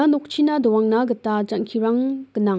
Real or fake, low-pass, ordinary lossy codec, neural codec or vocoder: real; none; none; none